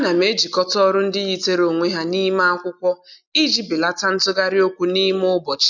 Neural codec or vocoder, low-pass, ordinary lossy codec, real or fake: none; 7.2 kHz; none; real